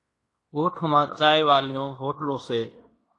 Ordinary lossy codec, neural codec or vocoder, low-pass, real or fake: AAC, 48 kbps; codec, 16 kHz in and 24 kHz out, 0.9 kbps, LongCat-Audio-Codec, fine tuned four codebook decoder; 10.8 kHz; fake